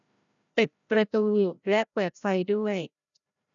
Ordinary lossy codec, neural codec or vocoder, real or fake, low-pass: none; codec, 16 kHz, 0.5 kbps, FreqCodec, larger model; fake; 7.2 kHz